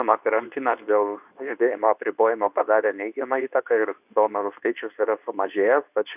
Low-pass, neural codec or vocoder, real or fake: 3.6 kHz; codec, 24 kHz, 0.9 kbps, WavTokenizer, medium speech release version 2; fake